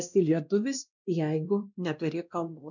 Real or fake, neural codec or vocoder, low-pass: fake; codec, 16 kHz, 1 kbps, X-Codec, WavLM features, trained on Multilingual LibriSpeech; 7.2 kHz